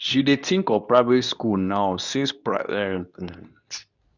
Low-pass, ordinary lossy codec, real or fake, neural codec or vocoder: 7.2 kHz; none; fake; codec, 24 kHz, 0.9 kbps, WavTokenizer, medium speech release version 2